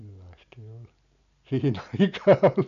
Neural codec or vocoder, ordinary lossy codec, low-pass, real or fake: none; none; 7.2 kHz; real